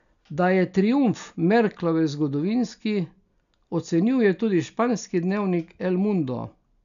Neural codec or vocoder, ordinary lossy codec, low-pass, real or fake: none; none; 7.2 kHz; real